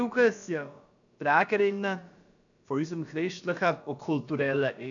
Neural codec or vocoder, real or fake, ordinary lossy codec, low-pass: codec, 16 kHz, about 1 kbps, DyCAST, with the encoder's durations; fake; none; 7.2 kHz